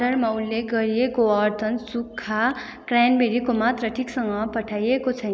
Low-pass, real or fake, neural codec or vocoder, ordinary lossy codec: none; real; none; none